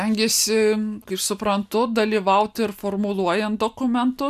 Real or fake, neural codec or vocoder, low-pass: real; none; 14.4 kHz